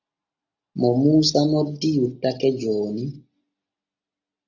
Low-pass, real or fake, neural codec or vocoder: 7.2 kHz; real; none